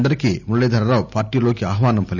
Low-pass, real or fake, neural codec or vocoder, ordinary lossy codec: 7.2 kHz; real; none; none